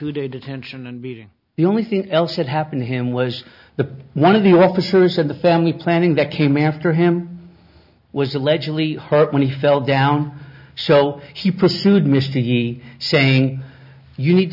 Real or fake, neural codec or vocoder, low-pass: real; none; 5.4 kHz